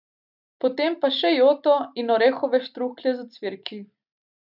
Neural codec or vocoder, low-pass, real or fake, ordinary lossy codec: none; 5.4 kHz; real; none